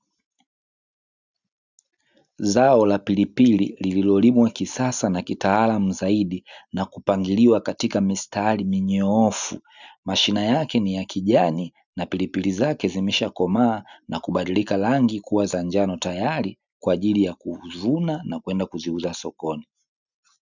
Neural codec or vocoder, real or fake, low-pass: none; real; 7.2 kHz